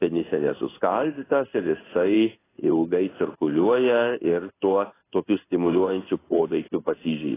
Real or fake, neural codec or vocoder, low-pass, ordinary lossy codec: fake; codec, 16 kHz in and 24 kHz out, 1 kbps, XY-Tokenizer; 3.6 kHz; AAC, 16 kbps